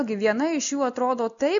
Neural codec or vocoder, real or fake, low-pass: none; real; 7.2 kHz